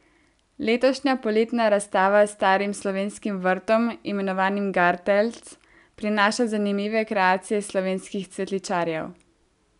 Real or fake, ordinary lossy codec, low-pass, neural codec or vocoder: real; none; 10.8 kHz; none